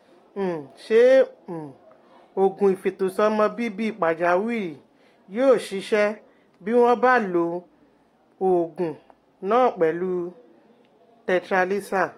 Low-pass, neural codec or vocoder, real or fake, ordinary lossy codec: 19.8 kHz; none; real; AAC, 48 kbps